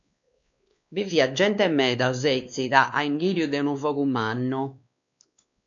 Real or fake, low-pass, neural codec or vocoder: fake; 7.2 kHz; codec, 16 kHz, 2 kbps, X-Codec, WavLM features, trained on Multilingual LibriSpeech